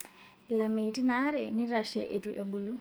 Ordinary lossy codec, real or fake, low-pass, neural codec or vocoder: none; fake; none; codec, 44.1 kHz, 2.6 kbps, SNAC